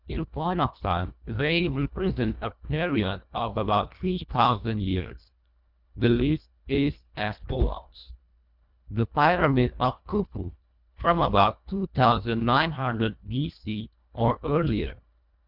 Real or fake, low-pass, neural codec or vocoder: fake; 5.4 kHz; codec, 24 kHz, 1.5 kbps, HILCodec